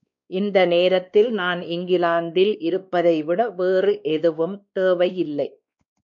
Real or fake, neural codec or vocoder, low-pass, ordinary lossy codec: fake; codec, 16 kHz, 2 kbps, X-Codec, WavLM features, trained on Multilingual LibriSpeech; 7.2 kHz; MP3, 96 kbps